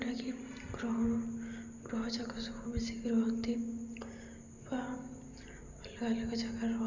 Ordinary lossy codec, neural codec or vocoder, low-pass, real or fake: none; none; 7.2 kHz; real